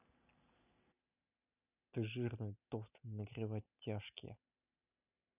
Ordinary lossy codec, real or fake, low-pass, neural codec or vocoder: none; real; 3.6 kHz; none